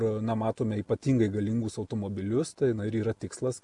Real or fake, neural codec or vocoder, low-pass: real; none; 10.8 kHz